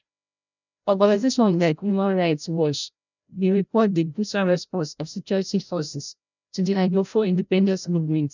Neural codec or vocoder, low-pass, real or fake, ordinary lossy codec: codec, 16 kHz, 0.5 kbps, FreqCodec, larger model; 7.2 kHz; fake; none